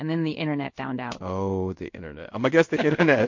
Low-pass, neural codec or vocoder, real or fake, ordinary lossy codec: 7.2 kHz; codec, 16 kHz in and 24 kHz out, 1 kbps, XY-Tokenizer; fake; MP3, 48 kbps